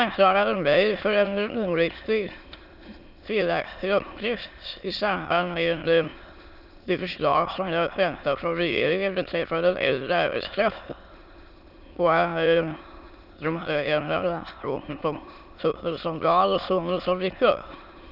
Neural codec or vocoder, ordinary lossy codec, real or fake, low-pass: autoencoder, 22.05 kHz, a latent of 192 numbers a frame, VITS, trained on many speakers; none; fake; 5.4 kHz